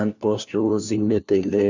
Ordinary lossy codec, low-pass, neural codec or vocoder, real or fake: Opus, 64 kbps; 7.2 kHz; codec, 16 kHz, 1 kbps, FunCodec, trained on LibriTTS, 50 frames a second; fake